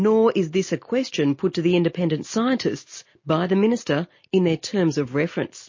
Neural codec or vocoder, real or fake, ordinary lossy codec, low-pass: none; real; MP3, 32 kbps; 7.2 kHz